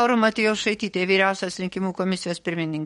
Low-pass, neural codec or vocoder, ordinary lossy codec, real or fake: 19.8 kHz; codec, 44.1 kHz, 7.8 kbps, DAC; MP3, 48 kbps; fake